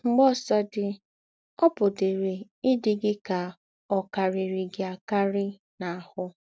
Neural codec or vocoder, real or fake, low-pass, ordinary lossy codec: none; real; none; none